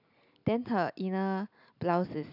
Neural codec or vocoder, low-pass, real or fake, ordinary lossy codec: none; 5.4 kHz; real; none